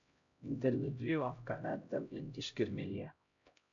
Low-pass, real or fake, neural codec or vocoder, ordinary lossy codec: 7.2 kHz; fake; codec, 16 kHz, 0.5 kbps, X-Codec, HuBERT features, trained on LibriSpeech; MP3, 64 kbps